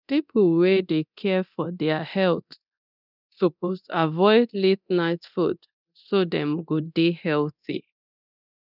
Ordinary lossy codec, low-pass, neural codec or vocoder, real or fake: none; 5.4 kHz; codec, 24 kHz, 0.9 kbps, DualCodec; fake